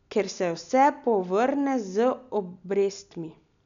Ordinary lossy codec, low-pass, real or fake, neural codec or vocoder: none; 7.2 kHz; real; none